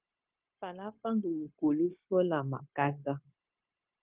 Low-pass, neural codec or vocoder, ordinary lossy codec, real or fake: 3.6 kHz; codec, 16 kHz, 0.9 kbps, LongCat-Audio-Codec; Opus, 24 kbps; fake